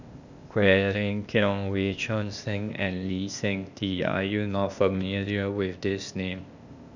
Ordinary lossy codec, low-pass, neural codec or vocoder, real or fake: none; 7.2 kHz; codec, 16 kHz, 0.8 kbps, ZipCodec; fake